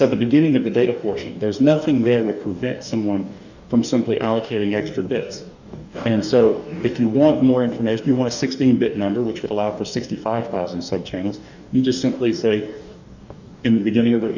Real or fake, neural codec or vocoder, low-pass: fake; codec, 44.1 kHz, 2.6 kbps, DAC; 7.2 kHz